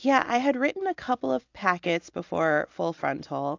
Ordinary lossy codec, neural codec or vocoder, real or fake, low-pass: AAC, 48 kbps; none; real; 7.2 kHz